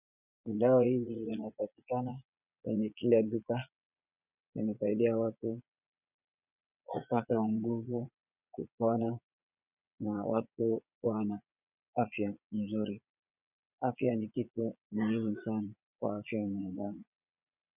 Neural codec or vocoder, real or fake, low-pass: vocoder, 22.05 kHz, 80 mel bands, Vocos; fake; 3.6 kHz